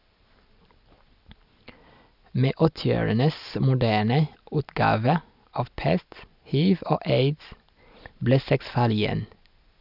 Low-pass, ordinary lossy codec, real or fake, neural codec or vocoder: 5.4 kHz; none; real; none